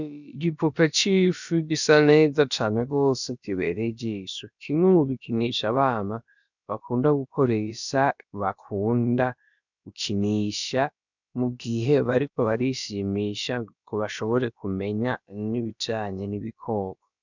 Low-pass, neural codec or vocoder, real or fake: 7.2 kHz; codec, 16 kHz, about 1 kbps, DyCAST, with the encoder's durations; fake